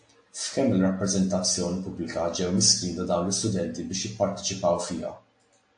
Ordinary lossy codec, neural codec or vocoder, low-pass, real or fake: AAC, 64 kbps; none; 9.9 kHz; real